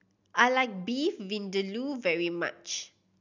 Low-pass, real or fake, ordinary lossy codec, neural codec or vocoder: 7.2 kHz; real; none; none